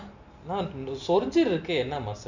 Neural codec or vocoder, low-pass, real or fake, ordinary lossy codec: none; 7.2 kHz; real; none